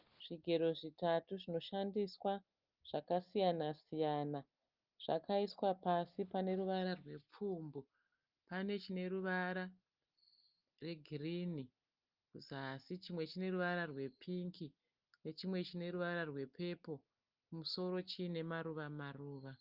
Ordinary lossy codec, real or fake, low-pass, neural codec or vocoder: Opus, 24 kbps; real; 5.4 kHz; none